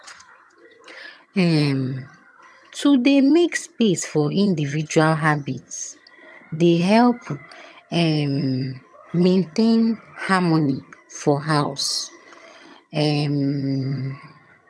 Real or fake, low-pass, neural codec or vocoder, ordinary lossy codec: fake; none; vocoder, 22.05 kHz, 80 mel bands, HiFi-GAN; none